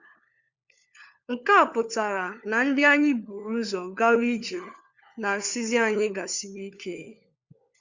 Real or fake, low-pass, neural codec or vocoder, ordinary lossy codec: fake; 7.2 kHz; codec, 16 kHz, 4 kbps, FunCodec, trained on LibriTTS, 50 frames a second; Opus, 64 kbps